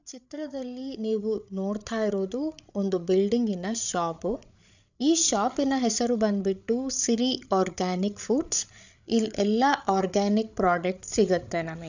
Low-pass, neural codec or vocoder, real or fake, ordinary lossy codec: 7.2 kHz; codec, 16 kHz, 8 kbps, FreqCodec, larger model; fake; none